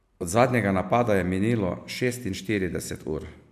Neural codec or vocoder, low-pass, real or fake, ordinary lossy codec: none; 14.4 kHz; real; MP3, 96 kbps